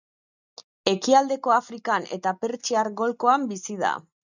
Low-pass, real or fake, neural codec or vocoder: 7.2 kHz; real; none